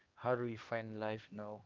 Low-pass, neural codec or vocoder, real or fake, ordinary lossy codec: 7.2 kHz; codec, 16 kHz, 2 kbps, X-Codec, HuBERT features, trained on LibriSpeech; fake; Opus, 32 kbps